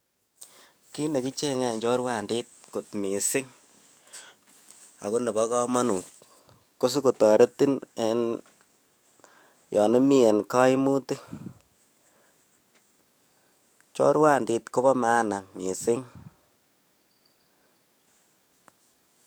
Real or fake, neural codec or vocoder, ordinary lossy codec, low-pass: fake; codec, 44.1 kHz, 7.8 kbps, DAC; none; none